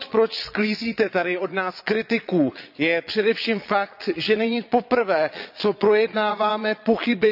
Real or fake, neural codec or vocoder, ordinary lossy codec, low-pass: fake; vocoder, 22.05 kHz, 80 mel bands, Vocos; none; 5.4 kHz